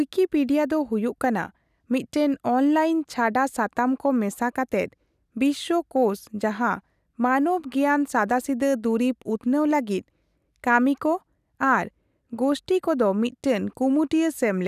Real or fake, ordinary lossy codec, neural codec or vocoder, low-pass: real; none; none; 14.4 kHz